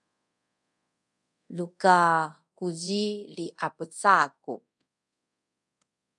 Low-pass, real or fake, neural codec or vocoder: 10.8 kHz; fake; codec, 24 kHz, 0.5 kbps, DualCodec